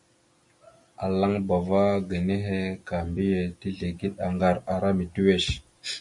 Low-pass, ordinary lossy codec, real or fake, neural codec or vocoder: 10.8 kHz; MP3, 48 kbps; real; none